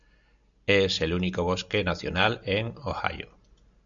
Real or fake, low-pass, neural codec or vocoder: real; 7.2 kHz; none